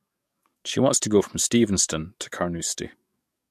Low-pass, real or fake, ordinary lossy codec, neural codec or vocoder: 14.4 kHz; fake; MP3, 64 kbps; codec, 44.1 kHz, 7.8 kbps, DAC